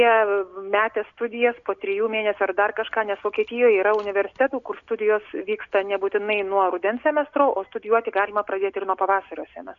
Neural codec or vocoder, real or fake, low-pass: none; real; 7.2 kHz